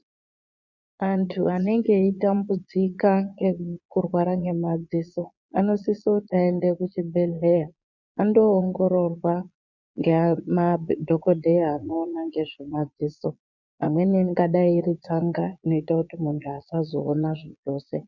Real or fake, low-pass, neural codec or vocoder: fake; 7.2 kHz; vocoder, 22.05 kHz, 80 mel bands, Vocos